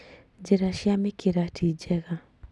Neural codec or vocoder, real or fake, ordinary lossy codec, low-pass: none; real; none; none